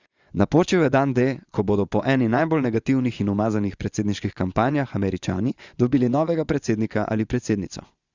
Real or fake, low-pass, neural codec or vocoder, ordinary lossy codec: fake; 7.2 kHz; vocoder, 24 kHz, 100 mel bands, Vocos; Opus, 64 kbps